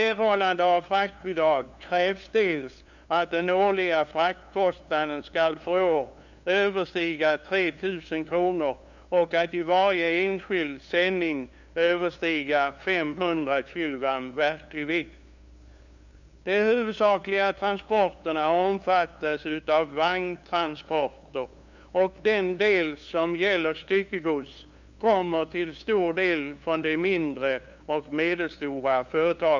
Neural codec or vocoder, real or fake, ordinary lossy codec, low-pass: codec, 16 kHz, 2 kbps, FunCodec, trained on LibriTTS, 25 frames a second; fake; none; 7.2 kHz